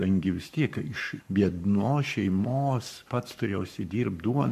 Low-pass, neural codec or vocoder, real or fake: 14.4 kHz; codec, 44.1 kHz, 7.8 kbps, Pupu-Codec; fake